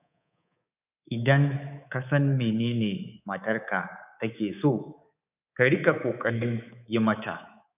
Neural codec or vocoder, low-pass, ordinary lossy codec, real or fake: codec, 24 kHz, 3.1 kbps, DualCodec; 3.6 kHz; none; fake